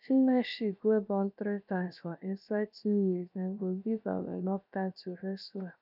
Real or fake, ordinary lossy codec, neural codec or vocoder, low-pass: fake; none; codec, 16 kHz, 0.7 kbps, FocalCodec; 5.4 kHz